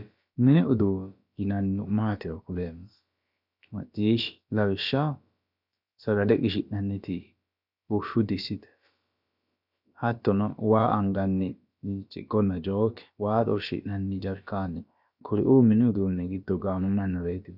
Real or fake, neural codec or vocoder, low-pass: fake; codec, 16 kHz, about 1 kbps, DyCAST, with the encoder's durations; 5.4 kHz